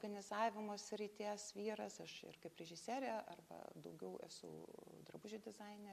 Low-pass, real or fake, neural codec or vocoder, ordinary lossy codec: 14.4 kHz; real; none; MP3, 96 kbps